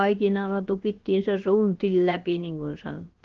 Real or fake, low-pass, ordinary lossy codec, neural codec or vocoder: fake; 7.2 kHz; Opus, 16 kbps; codec, 16 kHz, about 1 kbps, DyCAST, with the encoder's durations